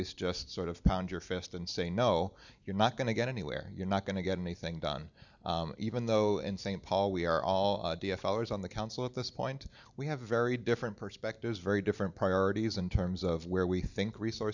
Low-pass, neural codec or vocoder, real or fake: 7.2 kHz; none; real